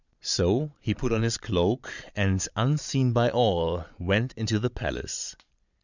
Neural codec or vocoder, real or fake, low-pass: vocoder, 22.05 kHz, 80 mel bands, Vocos; fake; 7.2 kHz